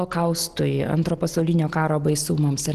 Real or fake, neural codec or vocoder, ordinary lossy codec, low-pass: real; none; Opus, 16 kbps; 14.4 kHz